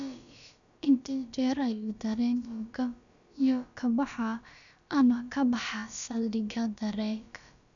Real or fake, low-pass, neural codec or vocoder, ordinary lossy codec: fake; 7.2 kHz; codec, 16 kHz, about 1 kbps, DyCAST, with the encoder's durations; none